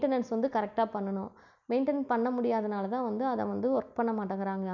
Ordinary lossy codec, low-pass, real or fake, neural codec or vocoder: none; 7.2 kHz; real; none